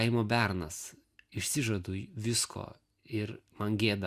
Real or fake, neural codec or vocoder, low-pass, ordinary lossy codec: real; none; 14.4 kHz; Opus, 64 kbps